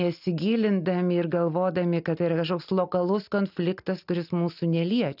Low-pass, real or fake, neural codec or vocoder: 5.4 kHz; real; none